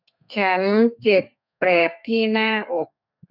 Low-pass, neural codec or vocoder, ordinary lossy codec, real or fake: 5.4 kHz; codec, 32 kHz, 1.9 kbps, SNAC; none; fake